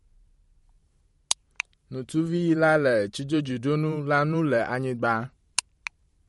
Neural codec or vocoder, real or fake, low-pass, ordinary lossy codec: vocoder, 44.1 kHz, 128 mel bands every 512 samples, BigVGAN v2; fake; 19.8 kHz; MP3, 48 kbps